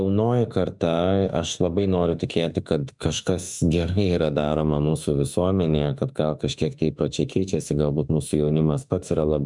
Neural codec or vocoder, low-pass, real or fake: autoencoder, 48 kHz, 32 numbers a frame, DAC-VAE, trained on Japanese speech; 10.8 kHz; fake